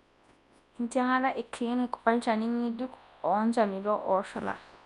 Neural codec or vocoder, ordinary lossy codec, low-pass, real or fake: codec, 24 kHz, 0.9 kbps, WavTokenizer, large speech release; none; 10.8 kHz; fake